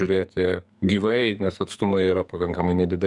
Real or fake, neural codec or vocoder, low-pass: fake; codec, 44.1 kHz, 2.6 kbps, SNAC; 10.8 kHz